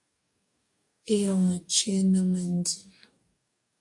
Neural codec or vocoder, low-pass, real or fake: codec, 44.1 kHz, 2.6 kbps, DAC; 10.8 kHz; fake